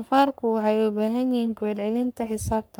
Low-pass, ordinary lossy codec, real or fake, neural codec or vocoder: none; none; fake; codec, 44.1 kHz, 3.4 kbps, Pupu-Codec